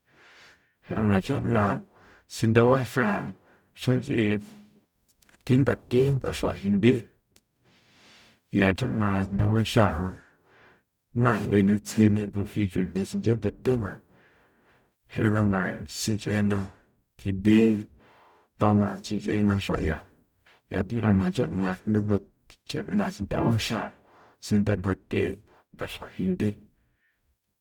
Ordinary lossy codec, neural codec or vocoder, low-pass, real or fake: none; codec, 44.1 kHz, 0.9 kbps, DAC; 19.8 kHz; fake